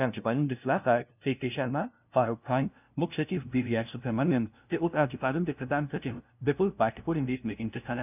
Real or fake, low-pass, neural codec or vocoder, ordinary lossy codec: fake; 3.6 kHz; codec, 16 kHz, 0.5 kbps, FunCodec, trained on LibriTTS, 25 frames a second; none